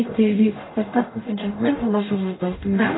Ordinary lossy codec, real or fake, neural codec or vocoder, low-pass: AAC, 16 kbps; fake; codec, 44.1 kHz, 0.9 kbps, DAC; 7.2 kHz